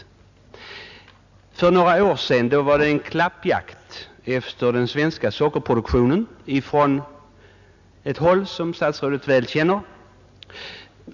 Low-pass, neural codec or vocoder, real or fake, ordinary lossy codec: 7.2 kHz; none; real; none